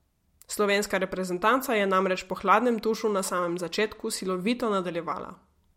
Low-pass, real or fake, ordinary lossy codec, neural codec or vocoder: 19.8 kHz; real; MP3, 64 kbps; none